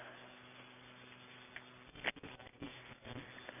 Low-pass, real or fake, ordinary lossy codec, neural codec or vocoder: 3.6 kHz; real; none; none